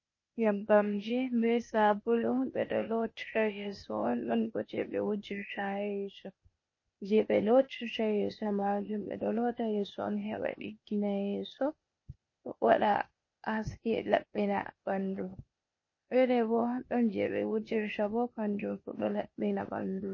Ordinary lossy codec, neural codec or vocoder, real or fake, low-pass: MP3, 32 kbps; codec, 16 kHz, 0.8 kbps, ZipCodec; fake; 7.2 kHz